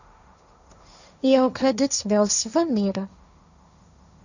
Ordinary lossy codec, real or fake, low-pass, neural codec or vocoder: none; fake; 7.2 kHz; codec, 16 kHz, 1.1 kbps, Voila-Tokenizer